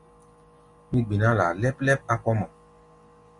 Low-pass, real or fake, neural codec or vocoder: 10.8 kHz; real; none